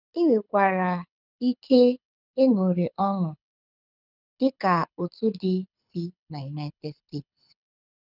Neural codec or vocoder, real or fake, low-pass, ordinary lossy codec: codec, 24 kHz, 6 kbps, HILCodec; fake; 5.4 kHz; none